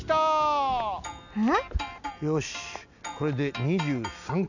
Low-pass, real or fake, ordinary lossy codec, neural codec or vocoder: 7.2 kHz; real; none; none